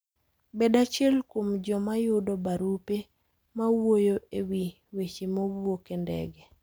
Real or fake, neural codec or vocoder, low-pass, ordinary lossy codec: real; none; none; none